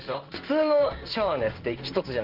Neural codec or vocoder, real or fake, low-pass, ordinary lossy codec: codec, 16 kHz in and 24 kHz out, 1 kbps, XY-Tokenizer; fake; 5.4 kHz; Opus, 24 kbps